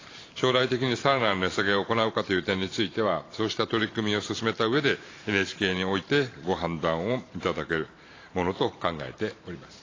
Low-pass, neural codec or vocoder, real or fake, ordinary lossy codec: 7.2 kHz; vocoder, 44.1 kHz, 128 mel bands every 512 samples, BigVGAN v2; fake; AAC, 32 kbps